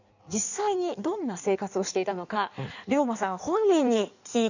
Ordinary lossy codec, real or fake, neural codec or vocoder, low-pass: none; fake; codec, 16 kHz in and 24 kHz out, 1.1 kbps, FireRedTTS-2 codec; 7.2 kHz